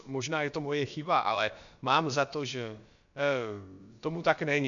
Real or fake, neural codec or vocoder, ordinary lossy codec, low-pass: fake; codec, 16 kHz, about 1 kbps, DyCAST, with the encoder's durations; MP3, 64 kbps; 7.2 kHz